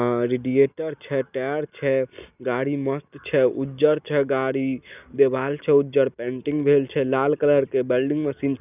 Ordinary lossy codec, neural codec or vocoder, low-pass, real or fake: none; none; 3.6 kHz; real